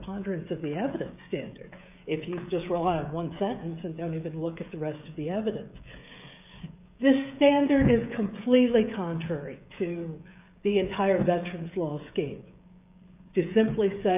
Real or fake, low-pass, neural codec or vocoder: fake; 3.6 kHz; codec, 16 kHz, 16 kbps, FreqCodec, smaller model